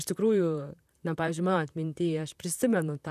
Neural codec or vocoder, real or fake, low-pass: vocoder, 44.1 kHz, 128 mel bands, Pupu-Vocoder; fake; 14.4 kHz